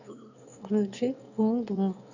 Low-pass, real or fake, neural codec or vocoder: 7.2 kHz; fake; autoencoder, 22.05 kHz, a latent of 192 numbers a frame, VITS, trained on one speaker